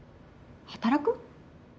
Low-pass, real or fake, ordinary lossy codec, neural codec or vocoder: none; real; none; none